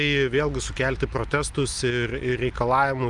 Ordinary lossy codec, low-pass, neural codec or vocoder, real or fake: Opus, 32 kbps; 10.8 kHz; none; real